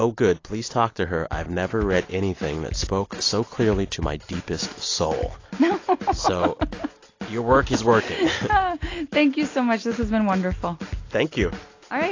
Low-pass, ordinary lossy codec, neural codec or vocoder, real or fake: 7.2 kHz; AAC, 32 kbps; none; real